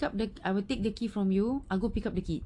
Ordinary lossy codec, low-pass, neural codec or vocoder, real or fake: AAC, 48 kbps; 10.8 kHz; none; real